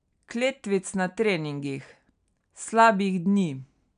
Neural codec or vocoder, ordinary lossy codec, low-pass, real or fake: none; none; 9.9 kHz; real